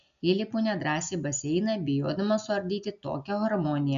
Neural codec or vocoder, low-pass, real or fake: none; 7.2 kHz; real